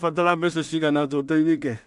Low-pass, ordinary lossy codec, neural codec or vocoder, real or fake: 10.8 kHz; none; codec, 16 kHz in and 24 kHz out, 0.4 kbps, LongCat-Audio-Codec, two codebook decoder; fake